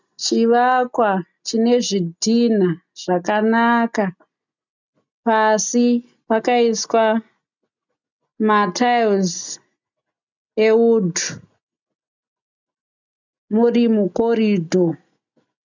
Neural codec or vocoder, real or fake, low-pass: none; real; 7.2 kHz